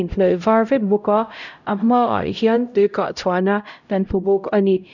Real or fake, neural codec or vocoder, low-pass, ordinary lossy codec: fake; codec, 16 kHz, 0.5 kbps, X-Codec, HuBERT features, trained on LibriSpeech; 7.2 kHz; none